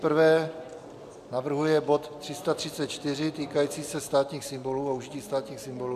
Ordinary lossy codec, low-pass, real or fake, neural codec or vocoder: MP3, 96 kbps; 14.4 kHz; real; none